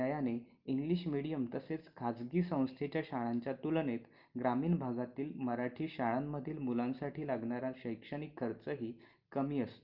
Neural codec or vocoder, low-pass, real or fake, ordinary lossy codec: none; 5.4 kHz; real; Opus, 24 kbps